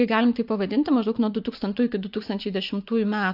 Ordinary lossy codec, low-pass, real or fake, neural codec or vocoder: AAC, 48 kbps; 5.4 kHz; fake; vocoder, 24 kHz, 100 mel bands, Vocos